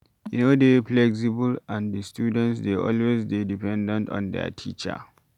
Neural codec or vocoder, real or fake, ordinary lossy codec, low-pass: none; real; none; 19.8 kHz